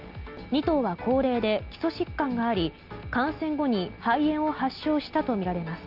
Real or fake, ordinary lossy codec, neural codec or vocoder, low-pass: real; Opus, 24 kbps; none; 5.4 kHz